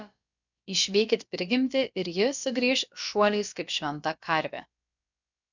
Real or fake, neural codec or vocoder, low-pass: fake; codec, 16 kHz, about 1 kbps, DyCAST, with the encoder's durations; 7.2 kHz